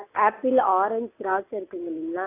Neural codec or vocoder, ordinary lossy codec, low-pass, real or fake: none; AAC, 24 kbps; 3.6 kHz; real